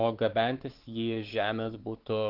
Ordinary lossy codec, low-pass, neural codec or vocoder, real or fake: Opus, 16 kbps; 5.4 kHz; codec, 16 kHz, 4 kbps, X-Codec, WavLM features, trained on Multilingual LibriSpeech; fake